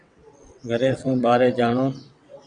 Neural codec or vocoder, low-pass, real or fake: vocoder, 22.05 kHz, 80 mel bands, WaveNeXt; 9.9 kHz; fake